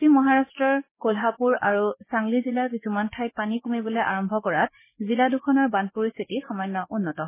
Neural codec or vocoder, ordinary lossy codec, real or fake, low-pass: codec, 16 kHz, 6 kbps, DAC; MP3, 16 kbps; fake; 3.6 kHz